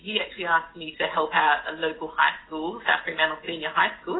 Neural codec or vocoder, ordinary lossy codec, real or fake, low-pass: none; AAC, 16 kbps; real; 7.2 kHz